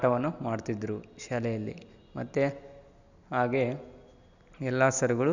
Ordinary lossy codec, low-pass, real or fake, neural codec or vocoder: none; 7.2 kHz; real; none